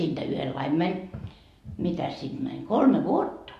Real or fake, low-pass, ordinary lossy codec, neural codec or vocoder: real; 14.4 kHz; MP3, 64 kbps; none